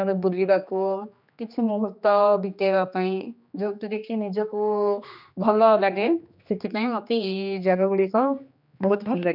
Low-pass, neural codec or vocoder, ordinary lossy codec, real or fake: 5.4 kHz; codec, 16 kHz, 2 kbps, X-Codec, HuBERT features, trained on general audio; none; fake